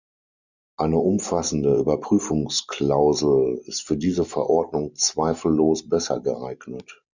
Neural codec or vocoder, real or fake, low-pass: none; real; 7.2 kHz